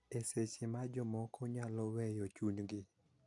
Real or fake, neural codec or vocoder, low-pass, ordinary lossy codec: real; none; 10.8 kHz; AAC, 64 kbps